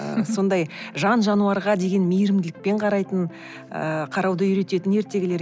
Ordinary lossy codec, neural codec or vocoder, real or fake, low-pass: none; none; real; none